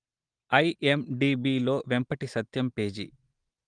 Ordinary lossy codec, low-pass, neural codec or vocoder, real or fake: Opus, 24 kbps; 9.9 kHz; none; real